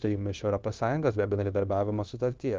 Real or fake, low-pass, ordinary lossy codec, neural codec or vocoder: fake; 7.2 kHz; Opus, 32 kbps; codec, 16 kHz, 0.9 kbps, LongCat-Audio-Codec